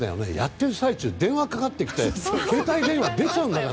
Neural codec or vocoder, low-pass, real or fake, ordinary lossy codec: none; none; real; none